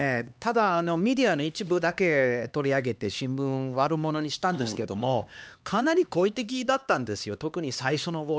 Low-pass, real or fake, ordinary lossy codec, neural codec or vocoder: none; fake; none; codec, 16 kHz, 2 kbps, X-Codec, HuBERT features, trained on LibriSpeech